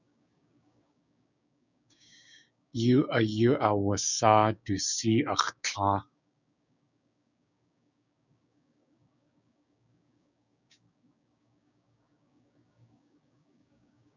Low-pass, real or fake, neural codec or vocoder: 7.2 kHz; fake; codec, 16 kHz, 6 kbps, DAC